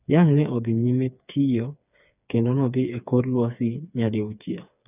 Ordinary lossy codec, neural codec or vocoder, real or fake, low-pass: none; codec, 16 kHz, 4 kbps, FreqCodec, smaller model; fake; 3.6 kHz